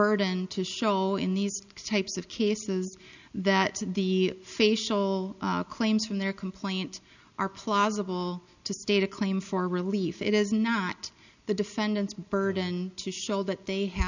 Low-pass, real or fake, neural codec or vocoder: 7.2 kHz; real; none